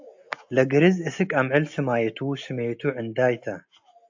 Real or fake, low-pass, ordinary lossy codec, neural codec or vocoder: real; 7.2 kHz; AAC, 48 kbps; none